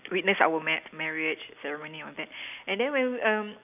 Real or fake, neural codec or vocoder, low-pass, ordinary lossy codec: real; none; 3.6 kHz; none